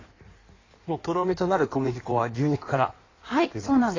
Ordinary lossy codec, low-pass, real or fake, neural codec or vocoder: AAC, 32 kbps; 7.2 kHz; fake; codec, 16 kHz in and 24 kHz out, 1.1 kbps, FireRedTTS-2 codec